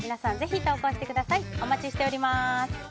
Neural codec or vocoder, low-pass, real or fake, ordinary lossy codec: none; none; real; none